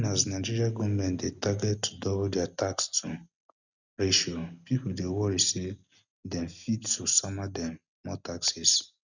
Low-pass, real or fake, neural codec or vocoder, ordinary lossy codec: 7.2 kHz; real; none; none